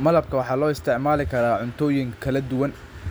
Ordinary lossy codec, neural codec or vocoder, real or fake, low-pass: none; none; real; none